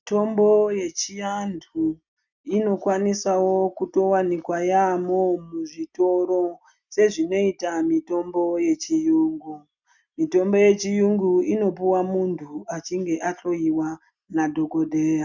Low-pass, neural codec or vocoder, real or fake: 7.2 kHz; none; real